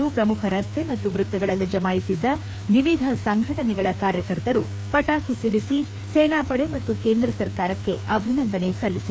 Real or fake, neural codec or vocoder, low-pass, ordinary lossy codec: fake; codec, 16 kHz, 2 kbps, FreqCodec, larger model; none; none